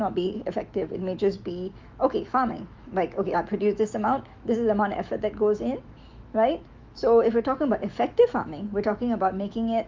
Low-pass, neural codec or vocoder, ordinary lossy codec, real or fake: 7.2 kHz; autoencoder, 48 kHz, 128 numbers a frame, DAC-VAE, trained on Japanese speech; Opus, 24 kbps; fake